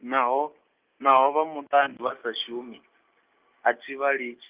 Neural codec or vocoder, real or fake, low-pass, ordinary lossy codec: none; real; 3.6 kHz; Opus, 24 kbps